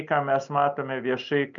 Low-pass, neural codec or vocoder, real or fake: 7.2 kHz; none; real